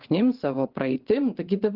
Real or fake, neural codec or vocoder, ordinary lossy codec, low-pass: fake; vocoder, 22.05 kHz, 80 mel bands, WaveNeXt; Opus, 16 kbps; 5.4 kHz